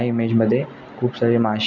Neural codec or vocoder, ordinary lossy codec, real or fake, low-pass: none; none; real; 7.2 kHz